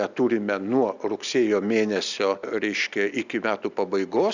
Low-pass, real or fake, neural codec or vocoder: 7.2 kHz; real; none